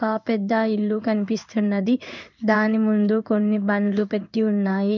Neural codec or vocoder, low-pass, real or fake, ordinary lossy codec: codec, 16 kHz in and 24 kHz out, 1 kbps, XY-Tokenizer; 7.2 kHz; fake; none